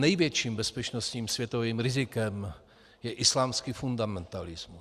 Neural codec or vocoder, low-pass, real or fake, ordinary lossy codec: none; 14.4 kHz; real; Opus, 64 kbps